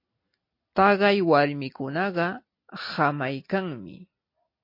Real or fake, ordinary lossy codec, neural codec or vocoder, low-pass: real; MP3, 32 kbps; none; 5.4 kHz